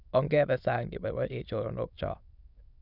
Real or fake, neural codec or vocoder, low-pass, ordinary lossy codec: fake; autoencoder, 22.05 kHz, a latent of 192 numbers a frame, VITS, trained on many speakers; 5.4 kHz; none